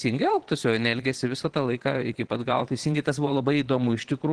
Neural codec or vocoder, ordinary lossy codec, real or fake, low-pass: vocoder, 22.05 kHz, 80 mel bands, Vocos; Opus, 16 kbps; fake; 9.9 kHz